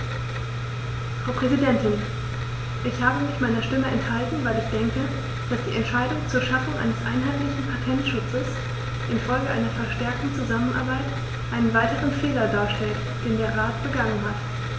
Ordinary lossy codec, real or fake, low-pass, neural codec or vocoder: none; real; none; none